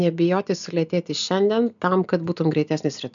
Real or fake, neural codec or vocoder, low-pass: real; none; 7.2 kHz